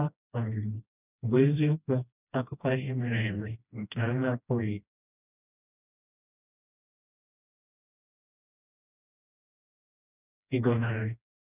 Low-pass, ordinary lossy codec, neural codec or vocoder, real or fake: 3.6 kHz; none; codec, 16 kHz, 1 kbps, FreqCodec, smaller model; fake